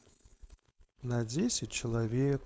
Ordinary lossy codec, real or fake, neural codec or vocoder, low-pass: none; fake; codec, 16 kHz, 4.8 kbps, FACodec; none